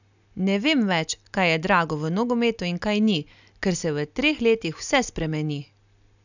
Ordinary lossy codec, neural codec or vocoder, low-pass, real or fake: none; none; 7.2 kHz; real